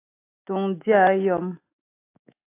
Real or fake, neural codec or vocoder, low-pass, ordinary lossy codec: fake; autoencoder, 48 kHz, 128 numbers a frame, DAC-VAE, trained on Japanese speech; 3.6 kHz; AAC, 24 kbps